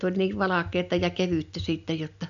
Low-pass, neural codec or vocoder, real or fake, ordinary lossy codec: 7.2 kHz; none; real; none